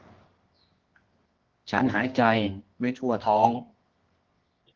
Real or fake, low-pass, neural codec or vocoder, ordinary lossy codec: fake; 7.2 kHz; codec, 24 kHz, 0.9 kbps, WavTokenizer, medium music audio release; Opus, 16 kbps